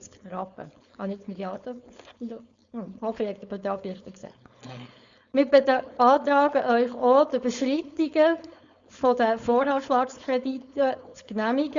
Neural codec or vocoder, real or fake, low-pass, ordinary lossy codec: codec, 16 kHz, 4.8 kbps, FACodec; fake; 7.2 kHz; Opus, 64 kbps